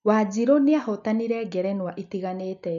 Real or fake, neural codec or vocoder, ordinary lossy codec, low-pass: real; none; none; 7.2 kHz